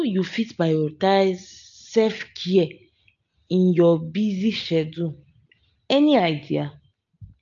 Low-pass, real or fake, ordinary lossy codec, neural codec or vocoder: 7.2 kHz; real; AAC, 64 kbps; none